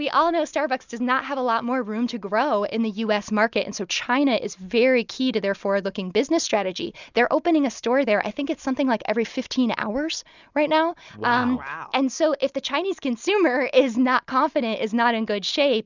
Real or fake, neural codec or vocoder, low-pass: real; none; 7.2 kHz